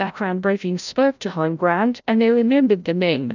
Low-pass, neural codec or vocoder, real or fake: 7.2 kHz; codec, 16 kHz, 0.5 kbps, FreqCodec, larger model; fake